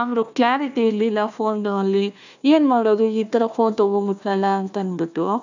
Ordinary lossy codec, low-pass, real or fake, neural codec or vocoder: none; 7.2 kHz; fake; codec, 16 kHz, 1 kbps, FunCodec, trained on Chinese and English, 50 frames a second